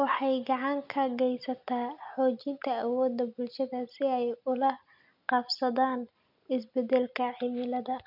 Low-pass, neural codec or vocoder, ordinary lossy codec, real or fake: 5.4 kHz; none; none; real